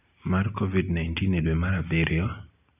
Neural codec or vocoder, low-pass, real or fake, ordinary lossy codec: none; 3.6 kHz; real; none